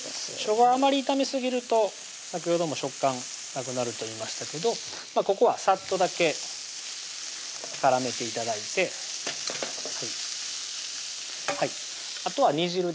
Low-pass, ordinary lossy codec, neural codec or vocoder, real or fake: none; none; none; real